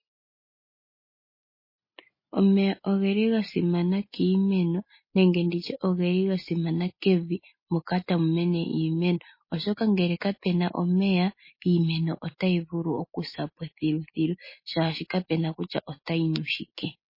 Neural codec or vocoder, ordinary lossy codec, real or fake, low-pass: none; MP3, 24 kbps; real; 5.4 kHz